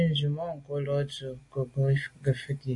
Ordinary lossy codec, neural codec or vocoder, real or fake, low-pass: AAC, 48 kbps; none; real; 10.8 kHz